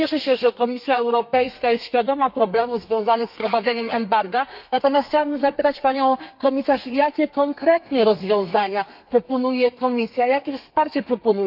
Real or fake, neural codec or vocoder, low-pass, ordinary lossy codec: fake; codec, 32 kHz, 1.9 kbps, SNAC; 5.4 kHz; MP3, 48 kbps